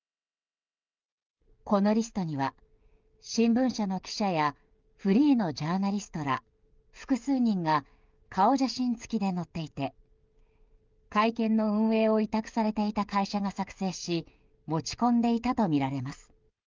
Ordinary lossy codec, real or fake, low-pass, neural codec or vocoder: Opus, 32 kbps; fake; 7.2 kHz; codec, 16 kHz, 16 kbps, FreqCodec, smaller model